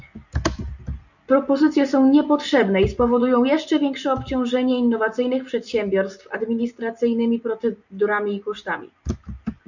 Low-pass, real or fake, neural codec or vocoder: 7.2 kHz; real; none